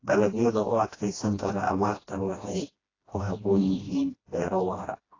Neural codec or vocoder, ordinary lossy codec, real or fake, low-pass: codec, 16 kHz, 1 kbps, FreqCodec, smaller model; AAC, 32 kbps; fake; 7.2 kHz